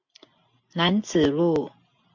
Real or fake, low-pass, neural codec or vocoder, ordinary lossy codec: real; 7.2 kHz; none; MP3, 48 kbps